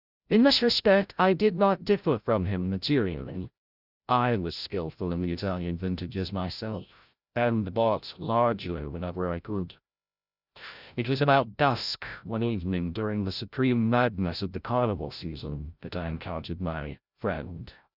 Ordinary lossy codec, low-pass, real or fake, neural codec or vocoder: Opus, 64 kbps; 5.4 kHz; fake; codec, 16 kHz, 0.5 kbps, FreqCodec, larger model